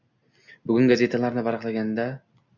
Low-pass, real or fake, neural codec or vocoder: 7.2 kHz; real; none